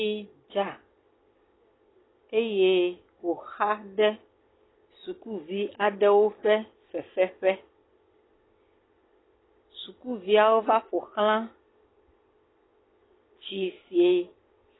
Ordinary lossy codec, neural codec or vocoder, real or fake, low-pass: AAC, 16 kbps; none; real; 7.2 kHz